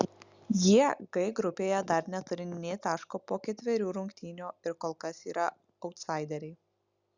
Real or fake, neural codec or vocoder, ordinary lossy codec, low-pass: real; none; Opus, 64 kbps; 7.2 kHz